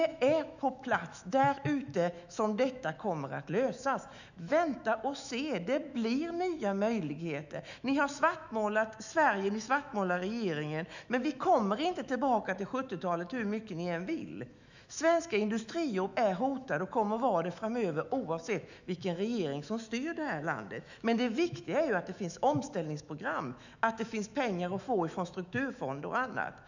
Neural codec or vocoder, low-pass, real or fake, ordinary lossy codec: autoencoder, 48 kHz, 128 numbers a frame, DAC-VAE, trained on Japanese speech; 7.2 kHz; fake; none